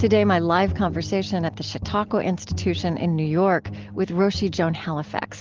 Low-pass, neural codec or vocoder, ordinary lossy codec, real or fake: 7.2 kHz; none; Opus, 16 kbps; real